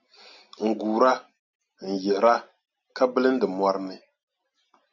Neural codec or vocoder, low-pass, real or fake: none; 7.2 kHz; real